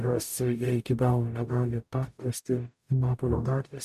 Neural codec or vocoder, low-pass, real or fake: codec, 44.1 kHz, 0.9 kbps, DAC; 14.4 kHz; fake